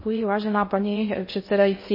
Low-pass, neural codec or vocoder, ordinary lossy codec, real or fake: 5.4 kHz; codec, 16 kHz in and 24 kHz out, 0.6 kbps, FocalCodec, streaming, 2048 codes; MP3, 24 kbps; fake